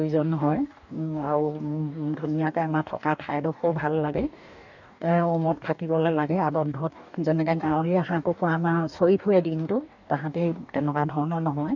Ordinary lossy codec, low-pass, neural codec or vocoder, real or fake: none; 7.2 kHz; codec, 44.1 kHz, 2.6 kbps, DAC; fake